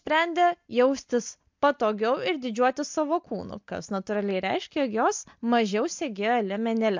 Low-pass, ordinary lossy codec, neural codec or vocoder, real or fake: 7.2 kHz; MP3, 64 kbps; none; real